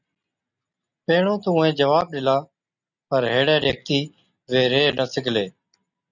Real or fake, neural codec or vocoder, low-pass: real; none; 7.2 kHz